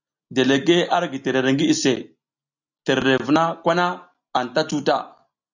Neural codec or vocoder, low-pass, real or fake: none; 7.2 kHz; real